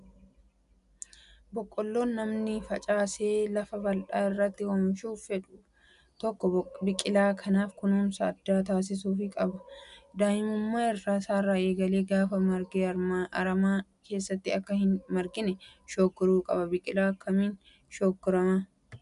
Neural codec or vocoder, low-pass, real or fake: none; 10.8 kHz; real